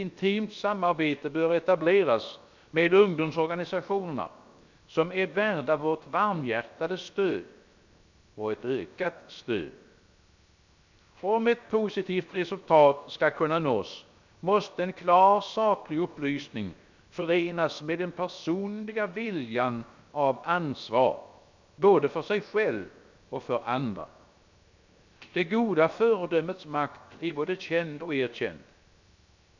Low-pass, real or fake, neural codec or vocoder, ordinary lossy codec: 7.2 kHz; fake; codec, 16 kHz, 0.7 kbps, FocalCodec; MP3, 64 kbps